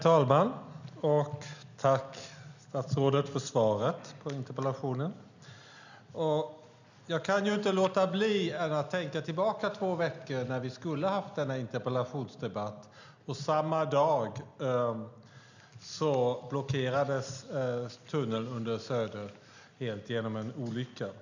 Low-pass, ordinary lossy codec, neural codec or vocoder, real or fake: 7.2 kHz; none; none; real